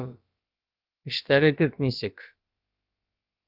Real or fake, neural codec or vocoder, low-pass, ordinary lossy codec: fake; codec, 16 kHz, about 1 kbps, DyCAST, with the encoder's durations; 5.4 kHz; Opus, 24 kbps